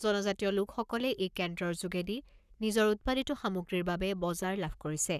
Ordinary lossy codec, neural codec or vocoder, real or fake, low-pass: none; codec, 44.1 kHz, 7.8 kbps, Pupu-Codec; fake; 14.4 kHz